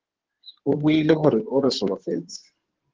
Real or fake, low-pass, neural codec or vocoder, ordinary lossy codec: fake; 7.2 kHz; codec, 16 kHz in and 24 kHz out, 2.2 kbps, FireRedTTS-2 codec; Opus, 16 kbps